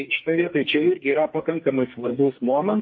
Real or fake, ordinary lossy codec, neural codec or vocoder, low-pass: fake; MP3, 32 kbps; codec, 44.1 kHz, 2.6 kbps, SNAC; 7.2 kHz